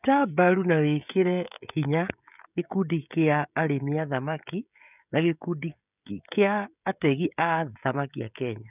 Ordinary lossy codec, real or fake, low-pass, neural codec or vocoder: none; fake; 3.6 kHz; codec, 16 kHz, 16 kbps, FreqCodec, smaller model